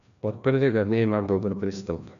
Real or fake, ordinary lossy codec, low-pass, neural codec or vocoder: fake; none; 7.2 kHz; codec, 16 kHz, 1 kbps, FreqCodec, larger model